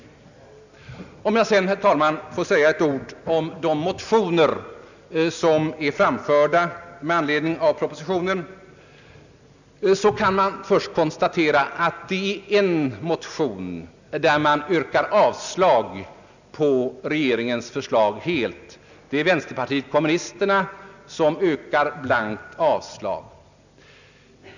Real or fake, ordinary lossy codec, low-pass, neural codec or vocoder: real; none; 7.2 kHz; none